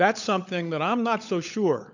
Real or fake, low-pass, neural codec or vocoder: fake; 7.2 kHz; codec, 16 kHz, 16 kbps, FunCodec, trained on LibriTTS, 50 frames a second